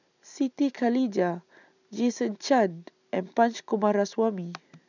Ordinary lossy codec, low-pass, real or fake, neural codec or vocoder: none; 7.2 kHz; real; none